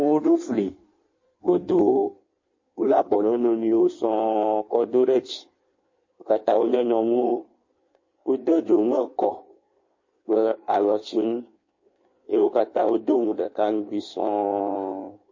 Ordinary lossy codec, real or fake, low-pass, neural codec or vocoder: MP3, 32 kbps; fake; 7.2 kHz; codec, 16 kHz in and 24 kHz out, 1.1 kbps, FireRedTTS-2 codec